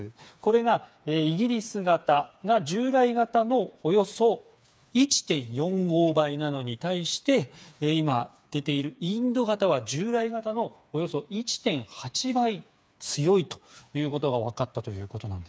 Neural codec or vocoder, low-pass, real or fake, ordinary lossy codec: codec, 16 kHz, 4 kbps, FreqCodec, smaller model; none; fake; none